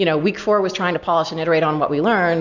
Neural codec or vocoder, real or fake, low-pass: none; real; 7.2 kHz